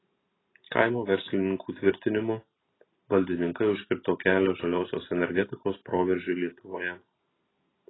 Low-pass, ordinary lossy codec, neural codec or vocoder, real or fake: 7.2 kHz; AAC, 16 kbps; none; real